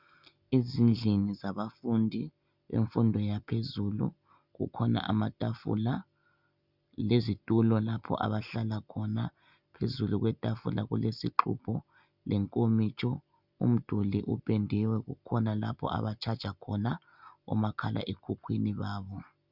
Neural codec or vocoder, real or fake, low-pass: none; real; 5.4 kHz